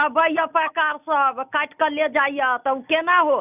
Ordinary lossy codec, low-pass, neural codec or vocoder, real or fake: none; 3.6 kHz; none; real